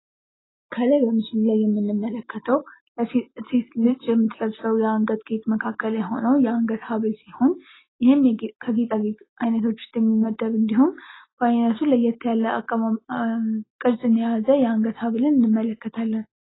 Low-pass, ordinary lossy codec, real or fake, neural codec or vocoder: 7.2 kHz; AAC, 16 kbps; real; none